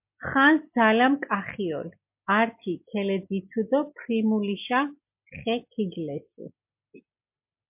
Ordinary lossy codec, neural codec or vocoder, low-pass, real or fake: MP3, 32 kbps; none; 3.6 kHz; real